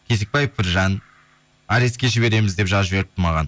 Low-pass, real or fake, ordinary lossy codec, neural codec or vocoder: none; real; none; none